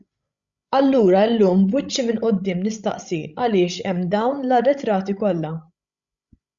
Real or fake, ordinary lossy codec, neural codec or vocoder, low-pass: fake; Opus, 64 kbps; codec, 16 kHz, 16 kbps, FreqCodec, larger model; 7.2 kHz